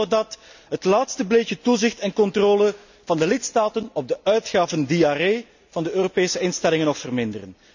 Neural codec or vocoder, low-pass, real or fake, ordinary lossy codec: none; 7.2 kHz; real; none